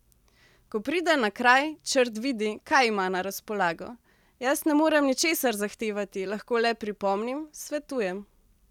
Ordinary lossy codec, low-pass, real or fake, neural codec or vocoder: none; 19.8 kHz; real; none